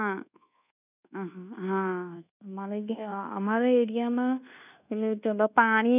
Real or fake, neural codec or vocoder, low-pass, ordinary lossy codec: fake; codec, 24 kHz, 1.2 kbps, DualCodec; 3.6 kHz; none